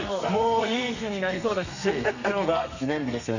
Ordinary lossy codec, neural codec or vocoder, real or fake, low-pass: none; codec, 32 kHz, 1.9 kbps, SNAC; fake; 7.2 kHz